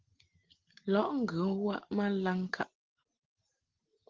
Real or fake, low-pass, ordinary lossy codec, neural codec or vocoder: real; 7.2 kHz; Opus, 16 kbps; none